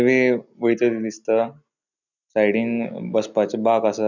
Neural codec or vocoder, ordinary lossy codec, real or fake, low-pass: none; none; real; 7.2 kHz